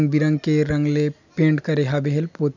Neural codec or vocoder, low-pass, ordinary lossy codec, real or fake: none; 7.2 kHz; none; real